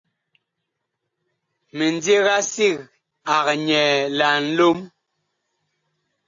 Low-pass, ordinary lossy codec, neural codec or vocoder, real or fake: 7.2 kHz; AAC, 48 kbps; none; real